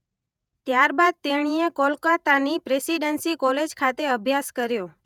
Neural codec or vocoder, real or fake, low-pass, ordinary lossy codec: vocoder, 48 kHz, 128 mel bands, Vocos; fake; 19.8 kHz; none